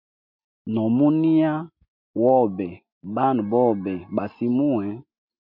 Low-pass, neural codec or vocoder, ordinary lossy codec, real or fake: 5.4 kHz; none; MP3, 48 kbps; real